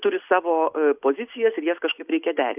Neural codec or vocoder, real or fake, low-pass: none; real; 3.6 kHz